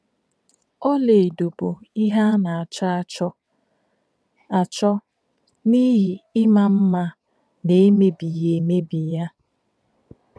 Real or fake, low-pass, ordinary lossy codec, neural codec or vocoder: fake; none; none; vocoder, 22.05 kHz, 80 mel bands, WaveNeXt